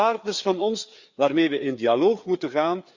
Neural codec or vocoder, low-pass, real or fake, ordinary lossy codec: codec, 16 kHz, 4 kbps, FreqCodec, larger model; 7.2 kHz; fake; Opus, 64 kbps